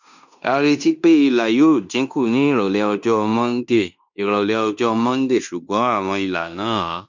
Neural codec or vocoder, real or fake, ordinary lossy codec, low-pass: codec, 16 kHz in and 24 kHz out, 0.9 kbps, LongCat-Audio-Codec, fine tuned four codebook decoder; fake; none; 7.2 kHz